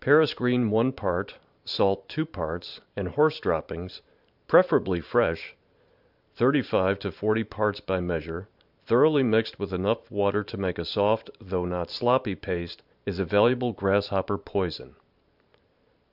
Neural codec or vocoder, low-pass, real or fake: vocoder, 44.1 kHz, 128 mel bands every 512 samples, BigVGAN v2; 5.4 kHz; fake